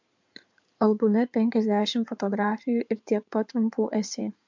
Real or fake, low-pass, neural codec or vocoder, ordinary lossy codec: fake; 7.2 kHz; codec, 16 kHz in and 24 kHz out, 2.2 kbps, FireRedTTS-2 codec; MP3, 64 kbps